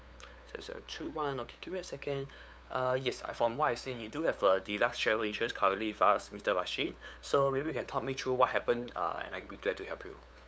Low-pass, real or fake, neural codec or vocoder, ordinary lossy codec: none; fake; codec, 16 kHz, 8 kbps, FunCodec, trained on LibriTTS, 25 frames a second; none